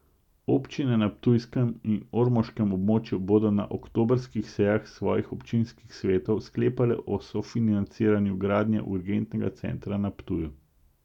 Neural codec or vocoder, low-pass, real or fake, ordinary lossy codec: none; 19.8 kHz; real; none